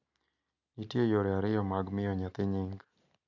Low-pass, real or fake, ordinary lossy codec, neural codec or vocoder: 7.2 kHz; real; none; none